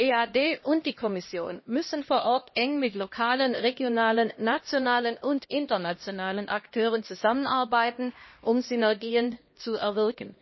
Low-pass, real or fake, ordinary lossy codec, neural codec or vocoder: 7.2 kHz; fake; MP3, 24 kbps; codec, 16 kHz, 1 kbps, X-Codec, HuBERT features, trained on LibriSpeech